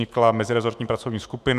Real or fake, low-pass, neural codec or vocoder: fake; 14.4 kHz; autoencoder, 48 kHz, 128 numbers a frame, DAC-VAE, trained on Japanese speech